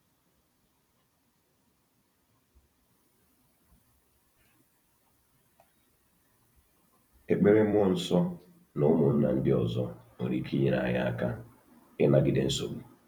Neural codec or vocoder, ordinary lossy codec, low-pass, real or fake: vocoder, 44.1 kHz, 128 mel bands every 256 samples, BigVGAN v2; none; 19.8 kHz; fake